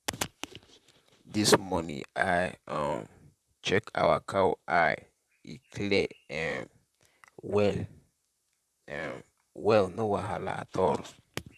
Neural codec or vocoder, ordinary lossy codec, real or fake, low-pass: vocoder, 44.1 kHz, 128 mel bands, Pupu-Vocoder; none; fake; 14.4 kHz